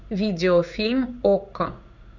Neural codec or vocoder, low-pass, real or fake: codec, 16 kHz in and 24 kHz out, 1 kbps, XY-Tokenizer; 7.2 kHz; fake